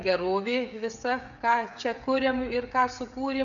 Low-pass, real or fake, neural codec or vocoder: 7.2 kHz; fake; codec, 16 kHz, 16 kbps, FreqCodec, smaller model